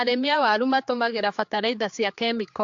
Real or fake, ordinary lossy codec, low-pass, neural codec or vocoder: fake; AAC, 48 kbps; 7.2 kHz; codec, 16 kHz, 4 kbps, X-Codec, HuBERT features, trained on general audio